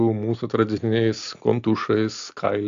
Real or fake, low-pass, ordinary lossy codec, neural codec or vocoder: real; 7.2 kHz; AAC, 64 kbps; none